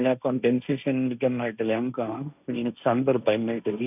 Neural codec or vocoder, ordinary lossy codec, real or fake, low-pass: codec, 16 kHz, 1.1 kbps, Voila-Tokenizer; none; fake; 3.6 kHz